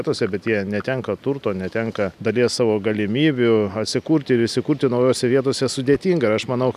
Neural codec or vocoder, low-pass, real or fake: none; 14.4 kHz; real